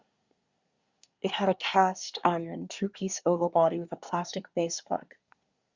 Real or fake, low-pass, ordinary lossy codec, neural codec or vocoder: fake; 7.2 kHz; Opus, 64 kbps; codec, 24 kHz, 1 kbps, SNAC